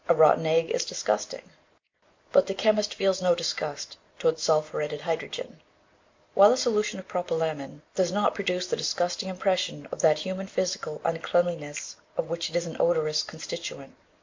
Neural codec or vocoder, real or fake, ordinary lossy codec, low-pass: none; real; MP3, 48 kbps; 7.2 kHz